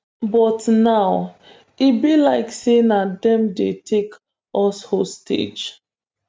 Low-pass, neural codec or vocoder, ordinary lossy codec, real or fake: none; none; none; real